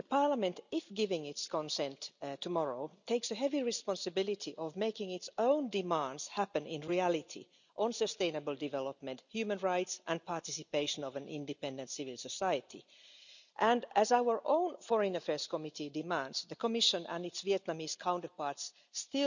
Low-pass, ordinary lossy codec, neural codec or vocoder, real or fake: 7.2 kHz; none; none; real